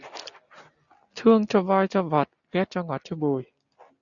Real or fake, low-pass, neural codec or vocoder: real; 7.2 kHz; none